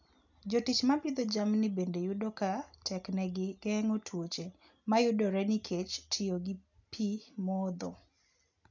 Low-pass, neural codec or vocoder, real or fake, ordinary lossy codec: 7.2 kHz; none; real; none